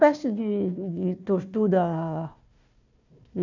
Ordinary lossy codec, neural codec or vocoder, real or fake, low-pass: none; codec, 16 kHz, 1 kbps, FunCodec, trained on Chinese and English, 50 frames a second; fake; 7.2 kHz